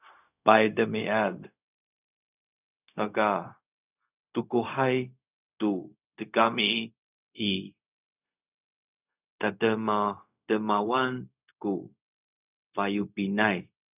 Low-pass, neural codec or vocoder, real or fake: 3.6 kHz; codec, 16 kHz, 0.4 kbps, LongCat-Audio-Codec; fake